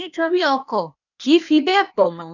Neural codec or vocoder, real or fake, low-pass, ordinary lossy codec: codec, 16 kHz, 0.8 kbps, ZipCodec; fake; 7.2 kHz; none